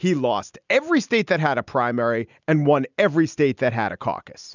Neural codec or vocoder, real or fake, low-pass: none; real; 7.2 kHz